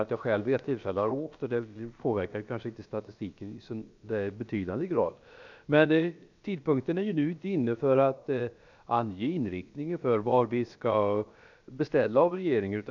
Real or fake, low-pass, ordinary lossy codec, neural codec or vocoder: fake; 7.2 kHz; none; codec, 16 kHz, about 1 kbps, DyCAST, with the encoder's durations